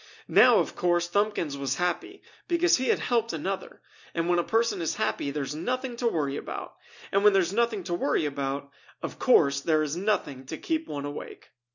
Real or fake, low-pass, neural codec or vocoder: real; 7.2 kHz; none